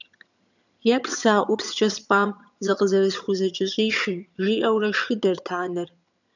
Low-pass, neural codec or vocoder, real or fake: 7.2 kHz; vocoder, 22.05 kHz, 80 mel bands, HiFi-GAN; fake